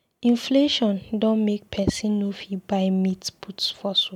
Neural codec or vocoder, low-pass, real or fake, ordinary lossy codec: none; 19.8 kHz; real; MP3, 96 kbps